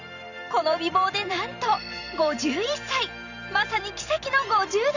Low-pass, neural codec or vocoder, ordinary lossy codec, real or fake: 7.2 kHz; vocoder, 44.1 kHz, 128 mel bands every 512 samples, BigVGAN v2; none; fake